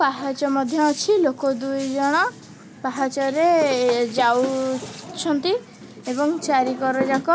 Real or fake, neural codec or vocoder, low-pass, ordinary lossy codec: real; none; none; none